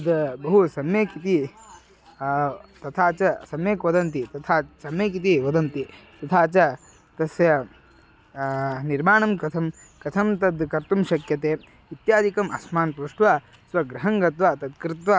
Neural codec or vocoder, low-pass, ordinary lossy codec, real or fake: none; none; none; real